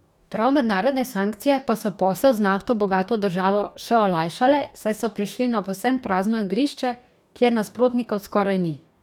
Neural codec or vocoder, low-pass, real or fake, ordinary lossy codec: codec, 44.1 kHz, 2.6 kbps, DAC; 19.8 kHz; fake; none